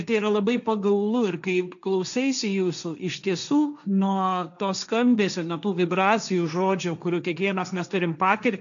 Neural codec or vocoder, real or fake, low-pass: codec, 16 kHz, 1.1 kbps, Voila-Tokenizer; fake; 7.2 kHz